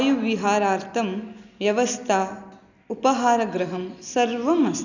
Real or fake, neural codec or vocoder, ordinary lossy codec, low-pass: real; none; none; 7.2 kHz